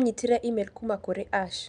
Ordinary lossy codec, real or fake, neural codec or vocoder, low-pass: none; real; none; 9.9 kHz